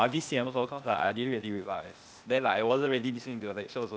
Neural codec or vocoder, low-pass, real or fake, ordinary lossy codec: codec, 16 kHz, 0.8 kbps, ZipCodec; none; fake; none